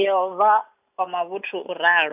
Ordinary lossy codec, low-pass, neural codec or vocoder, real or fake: none; 3.6 kHz; none; real